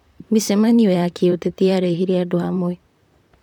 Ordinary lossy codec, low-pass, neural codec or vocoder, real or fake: none; 19.8 kHz; vocoder, 44.1 kHz, 128 mel bands, Pupu-Vocoder; fake